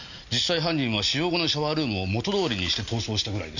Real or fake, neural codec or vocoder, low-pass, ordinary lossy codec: real; none; 7.2 kHz; none